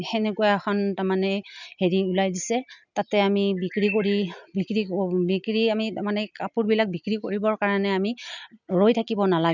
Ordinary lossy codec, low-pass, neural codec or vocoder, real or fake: none; 7.2 kHz; none; real